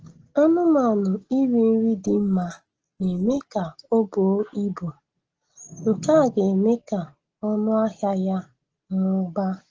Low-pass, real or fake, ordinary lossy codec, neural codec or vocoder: 7.2 kHz; real; Opus, 16 kbps; none